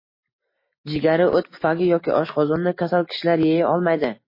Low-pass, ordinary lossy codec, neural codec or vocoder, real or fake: 5.4 kHz; MP3, 24 kbps; none; real